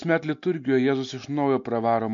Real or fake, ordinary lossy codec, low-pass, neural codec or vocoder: real; MP3, 48 kbps; 7.2 kHz; none